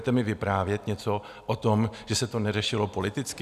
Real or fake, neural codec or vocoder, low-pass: real; none; 14.4 kHz